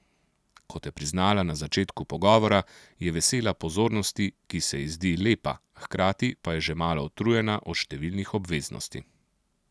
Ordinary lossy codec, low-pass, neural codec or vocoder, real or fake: none; none; none; real